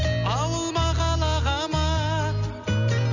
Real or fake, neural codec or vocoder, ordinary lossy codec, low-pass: real; none; none; 7.2 kHz